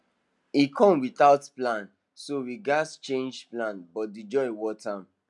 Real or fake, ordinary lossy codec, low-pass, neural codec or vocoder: real; none; 10.8 kHz; none